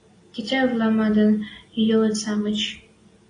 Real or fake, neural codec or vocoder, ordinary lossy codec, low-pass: real; none; AAC, 32 kbps; 9.9 kHz